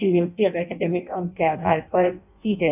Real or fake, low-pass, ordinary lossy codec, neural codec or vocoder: fake; 3.6 kHz; none; codec, 16 kHz in and 24 kHz out, 0.6 kbps, FireRedTTS-2 codec